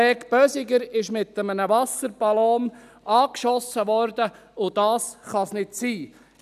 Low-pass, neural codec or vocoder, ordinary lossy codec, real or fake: 14.4 kHz; none; none; real